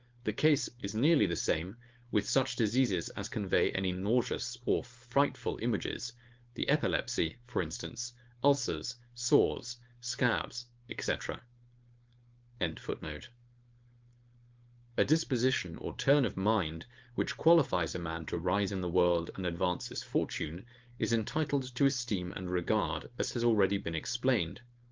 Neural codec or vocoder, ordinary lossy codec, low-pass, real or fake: codec, 16 kHz, 4.8 kbps, FACodec; Opus, 24 kbps; 7.2 kHz; fake